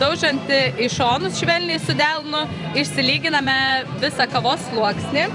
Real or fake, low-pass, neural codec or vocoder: fake; 10.8 kHz; vocoder, 44.1 kHz, 128 mel bands every 512 samples, BigVGAN v2